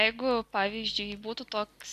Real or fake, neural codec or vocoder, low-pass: real; none; 14.4 kHz